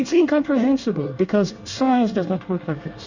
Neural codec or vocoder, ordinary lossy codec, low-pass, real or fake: codec, 24 kHz, 1 kbps, SNAC; Opus, 64 kbps; 7.2 kHz; fake